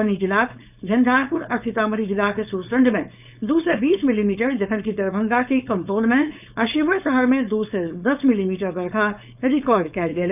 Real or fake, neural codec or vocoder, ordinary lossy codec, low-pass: fake; codec, 16 kHz, 4.8 kbps, FACodec; none; 3.6 kHz